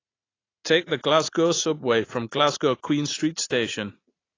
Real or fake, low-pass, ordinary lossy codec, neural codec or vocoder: fake; 7.2 kHz; AAC, 32 kbps; vocoder, 44.1 kHz, 80 mel bands, Vocos